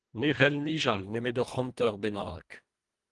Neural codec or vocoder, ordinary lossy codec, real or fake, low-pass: codec, 24 kHz, 1.5 kbps, HILCodec; Opus, 24 kbps; fake; 10.8 kHz